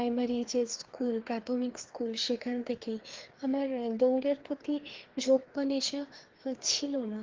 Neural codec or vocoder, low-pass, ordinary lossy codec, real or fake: codec, 16 kHz, 2 kbps, FreqCodec, larger model; 7.2 kHz; Opus, 16 kbps; fake